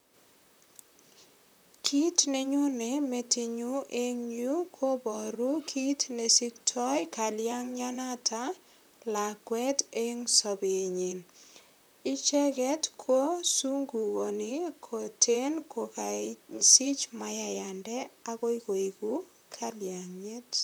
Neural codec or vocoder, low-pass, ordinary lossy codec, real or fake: vocoder, 44.1 kHz, 128 mel bands, Pupu-Vocoder; none; none; fake